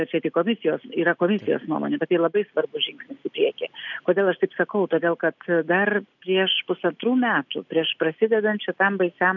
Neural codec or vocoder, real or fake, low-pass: none; real; 7.2 kHz